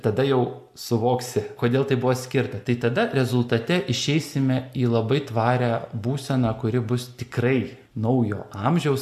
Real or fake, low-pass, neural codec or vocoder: real; 14.4 kHz; none